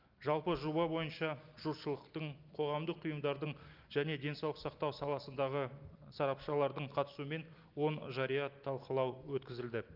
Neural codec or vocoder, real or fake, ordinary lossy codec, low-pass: none; real; Opus, 32 kbps; 5.4 kHz